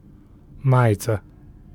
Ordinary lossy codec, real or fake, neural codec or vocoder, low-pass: none; real; none; 19.8 kHz